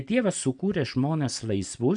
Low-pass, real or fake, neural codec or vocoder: 9.9 kHz; real; none